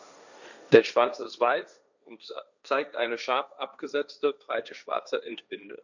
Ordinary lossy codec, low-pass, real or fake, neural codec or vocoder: none; 7.2 kHz; fake; codec, 16 kHz, 1.1 kbps, Voila-Tokenizer